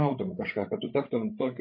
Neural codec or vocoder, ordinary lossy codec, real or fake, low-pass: codec, 16 kHz, 16 kbps, FreqCodec, larger model; MP3, 24 kbps; fake; 5.4 kHz